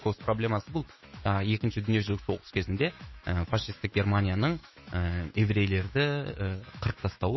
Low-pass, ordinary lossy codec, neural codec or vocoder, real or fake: 7.2 kHz; MP3, 24 kbps; autoencoder, 48 kHz, 128 numbers a frame, DAC-VAE, trained on Japanese speech; fake